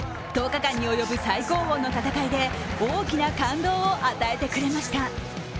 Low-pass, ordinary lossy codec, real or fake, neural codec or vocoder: none; none; real; none